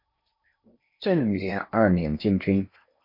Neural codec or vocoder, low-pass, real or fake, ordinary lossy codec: codec, 16 kHz in and 24 kHz out, 0.8 kbps, FocalCodec, streaming, 65536 codes; 5.4 kHz; fake; MP3, 32 kbps